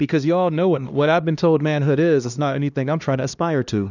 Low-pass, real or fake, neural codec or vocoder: 7.2 kHz; fake; codec, 16 kHz, 1 kbps, X-Codec, HuBERT features, trained on LibriSpeech